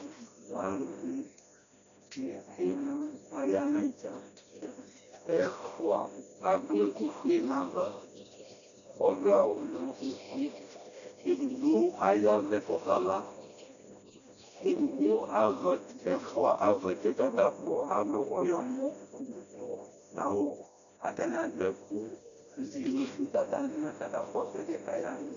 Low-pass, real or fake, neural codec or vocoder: 7.2 kHz; fake; codec, 16 kHz, 1 kbps, FreqCodec, smaller model